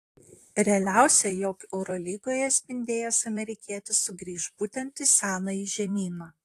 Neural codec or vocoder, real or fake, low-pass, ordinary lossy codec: vocoder, 44.1 kHz, 128 mel bands, Pupu-Vocoder; fake; 14.4 kHz; AAC, 64 kbps